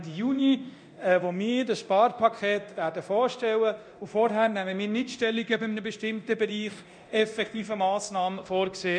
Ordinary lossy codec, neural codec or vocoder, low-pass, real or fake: none; codec, 24 kHz, 0.9 kbps, DualCodec; 9.9 kHz; fake